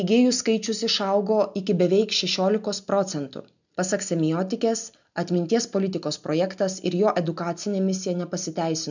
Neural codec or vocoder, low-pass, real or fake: none; 7.2 kHz; real